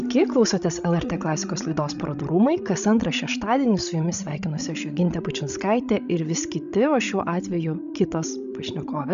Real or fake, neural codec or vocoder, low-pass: fake; codec, 16 kHz, 16 kbps, FreqCodec, larger model; 7.2 kHz